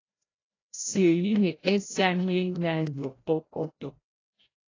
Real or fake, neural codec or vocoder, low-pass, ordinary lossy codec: fake; codec, 16 kHz, 0.5 kbps, FreqCodec, larger model; 7.2 kHz; AAC, 32 kbps